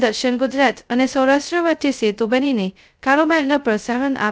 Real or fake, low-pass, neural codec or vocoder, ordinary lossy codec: fake; none; codec, 16 kHz, 0.2 kbps, FocalCodec; none